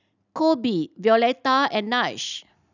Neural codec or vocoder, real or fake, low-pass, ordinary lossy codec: none; real; 7.2 kHz; none